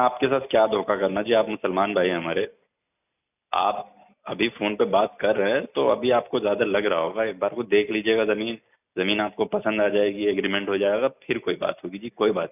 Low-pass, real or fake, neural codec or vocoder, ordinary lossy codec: 3.6 kHz; real; none; none